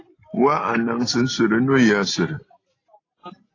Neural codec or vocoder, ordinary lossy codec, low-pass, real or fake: none; AAC, 32 kbps; 7.2 kHz; real